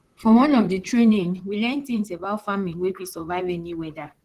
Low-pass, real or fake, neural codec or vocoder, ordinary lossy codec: 14.4 kHz; fake; vocoder, 44.1 kHz, 128 mel bands, Pupu-Vocoder; Opus, 16 kbps